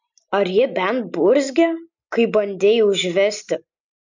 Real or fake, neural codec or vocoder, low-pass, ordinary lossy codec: real; none; 7.2 kHz; MP3, 64 kbps